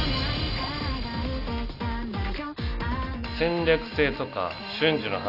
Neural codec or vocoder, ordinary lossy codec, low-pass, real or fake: none; none; 5.4 kHz; real